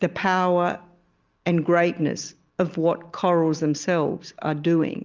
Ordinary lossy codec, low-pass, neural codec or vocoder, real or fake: Opus, 32 kbps; 7.2 kHz; none; real